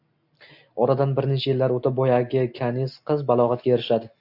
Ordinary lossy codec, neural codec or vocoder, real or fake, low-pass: AAC, 48 kbps; none; real; 5.4 kHz